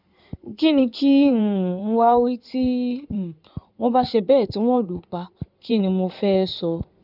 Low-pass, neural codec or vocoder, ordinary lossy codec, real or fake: 5.4 kHz; codec, 16 kHz in and 24 kHz out, 2.2 kbps, FireRedTTS-2 codec; none; fake